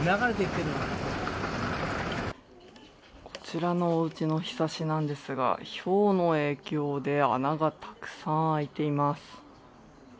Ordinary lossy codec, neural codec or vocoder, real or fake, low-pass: none; none; real; none